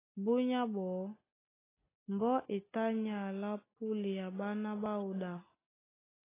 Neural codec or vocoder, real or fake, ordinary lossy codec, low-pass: none; real; AAC, 16 kbps; 3.6 kHz